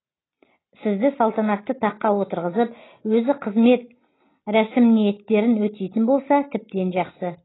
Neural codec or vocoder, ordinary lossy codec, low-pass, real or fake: none; AAC, 16 kbps; 7.2 kHz; real